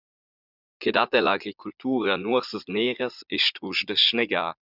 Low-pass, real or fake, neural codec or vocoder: 5.4 kHz; fake; vocoder, 44.1 kHz, 80 mel bands, Vocos